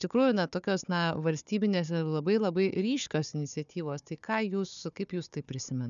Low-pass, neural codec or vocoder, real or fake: 7.2 kHz; codec, 16 kHz, 4 kbps, FunCodec, trained on Chinese and English, 50 frames a second; fake